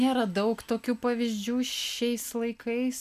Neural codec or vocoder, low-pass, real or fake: none; 14.4 kHz; real